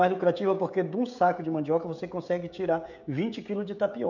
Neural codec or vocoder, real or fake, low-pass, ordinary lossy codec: codec, 16 kHz, 16 kbps, FreqCodec, smaller model; fake; 7.2 kHz; none